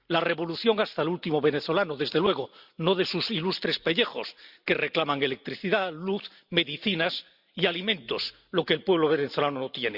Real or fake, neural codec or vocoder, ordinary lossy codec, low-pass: real; none; Opus, 64 kbps; 5.4 kHz